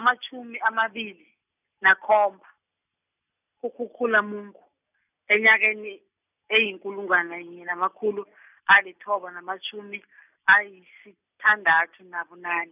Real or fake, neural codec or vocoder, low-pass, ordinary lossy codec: real; none; 3.6 kHz; none